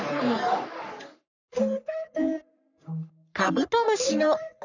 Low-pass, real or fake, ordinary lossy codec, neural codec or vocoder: 7.2 kHz; fake; none; codec, 44.1 kHz, 3.4 kbps, Pupu-Codec